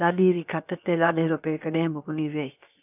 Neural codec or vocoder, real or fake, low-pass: codec, 16 kHz, 0.7 kbps, FocalCodec; fake; 3.6 kHz